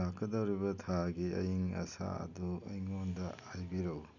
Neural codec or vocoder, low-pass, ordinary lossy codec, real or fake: none; 7.2 kHz; none; real